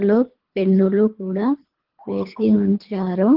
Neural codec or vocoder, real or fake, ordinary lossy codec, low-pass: codec, 24 kHz, 3 kbps, HILCodec; fake; Opus, 16 kbps; 5.4 kHz